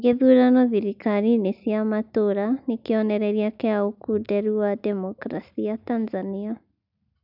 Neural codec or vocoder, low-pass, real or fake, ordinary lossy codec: none; 5.4 kHz; real; none